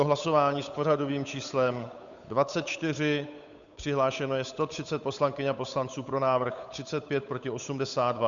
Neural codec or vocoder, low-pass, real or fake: codec, 16 kHz, 8 kbps, FunCodec, trained on Chinese and English, 25 frames a second; 7.2 kHz; fake